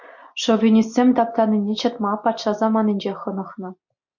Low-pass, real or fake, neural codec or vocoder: 7.2 kHz; real; none